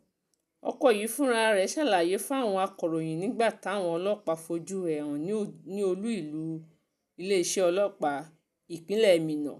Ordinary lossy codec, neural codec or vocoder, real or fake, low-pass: none; none; real; 14.4 kHz